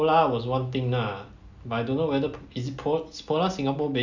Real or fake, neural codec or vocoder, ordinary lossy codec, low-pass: real; none; none; 7.2 kHz